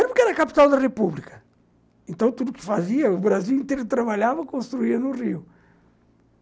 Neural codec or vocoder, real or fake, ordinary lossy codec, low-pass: none; real; none; none